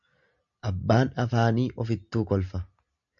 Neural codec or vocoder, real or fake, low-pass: none; real; 7.2 kHz